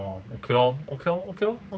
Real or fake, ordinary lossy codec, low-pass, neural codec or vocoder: fake; none; none; codec, 16 kHz, 4 kbps, X-Codec, HuBERT features, trained on general audio